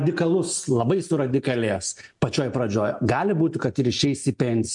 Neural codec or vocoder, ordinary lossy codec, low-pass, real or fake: none; MP3, 64 kbps; 10.8 kHz; real